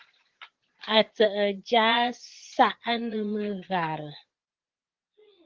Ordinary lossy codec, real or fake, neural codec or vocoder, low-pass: Opus, 16 kbps; fake; vocoder, 22.05 kHz, 80 mel bands, Vocos; 7.2 kHz